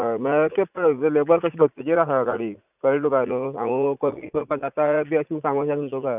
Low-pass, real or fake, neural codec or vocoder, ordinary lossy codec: 3.6 kHz; fake; vocoder, 44.1 kHz, 80 mel bands, Vocos; none